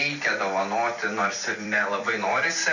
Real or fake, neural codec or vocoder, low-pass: fake; vocoder, 44.1 kHz, 128 mel bands every 256 samples, BigVGAN v2; 7.2 kHz